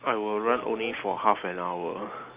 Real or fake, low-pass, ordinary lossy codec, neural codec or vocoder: real; 3.6 kHz; Opus, 24 kbps; none